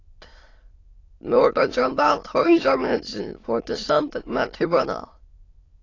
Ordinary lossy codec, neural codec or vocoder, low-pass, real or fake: AAC, 32 kbps; autoencoder, 22.05 kHz, a latent of 192 numbers a frame, VITS, trained on many speakers; 7.2 kHz; fake